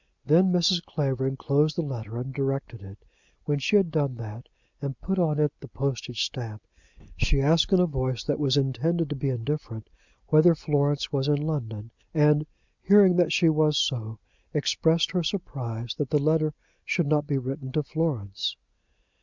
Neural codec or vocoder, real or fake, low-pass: none; real; 7.2 kHz